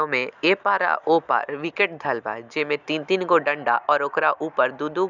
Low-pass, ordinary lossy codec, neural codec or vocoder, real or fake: 7.2 kHz; none; none; real